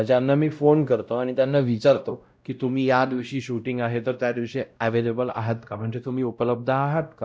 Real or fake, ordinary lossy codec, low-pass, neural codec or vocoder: fake; none; none; codec, 16 kHz, 0.5 kbps, X-Codec, WavLM features, trained on Multilingual LibriSpeech